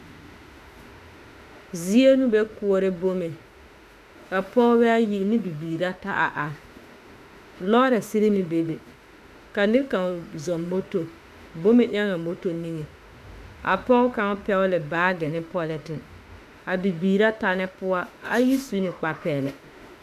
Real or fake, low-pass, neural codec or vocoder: fake; 14.4 kHz; autoencoder, 48 kHz, 32 numbers a frame, DAC-VAE, trained on Japanese speech